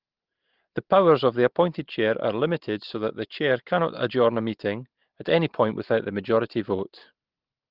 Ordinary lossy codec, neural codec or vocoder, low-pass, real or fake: Opus, 16 kbps; none; 5.4 kHz; real